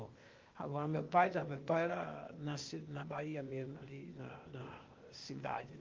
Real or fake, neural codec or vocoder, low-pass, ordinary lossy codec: fake; codec, 16 kHz, 0.8 kbps, ZipCodec; 7.2 kHz; Opus, 24 kbps